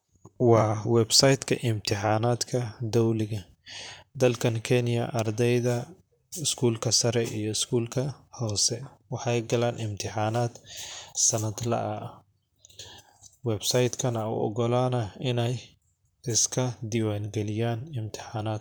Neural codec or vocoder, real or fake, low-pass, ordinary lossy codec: vocoder, 44.1 kHz, 128 mel bands, Pupu-Vocoder; fake; none; none